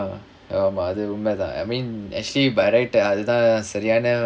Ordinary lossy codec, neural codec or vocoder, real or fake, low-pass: none; none; real; none